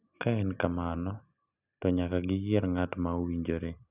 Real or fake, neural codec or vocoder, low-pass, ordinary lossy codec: real; none; 3.6 kHz; none